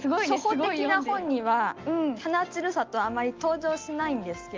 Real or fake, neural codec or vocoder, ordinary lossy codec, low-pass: real; none; Opus, 24 kbps; 7.2 kHz